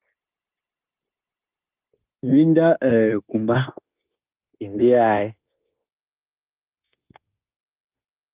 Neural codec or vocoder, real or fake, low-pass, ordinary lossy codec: codec, 16 kHz, 0.9 kbps, LongCat-Audio-Codec; fake; 3.6 kHz; Opus, 24 kbps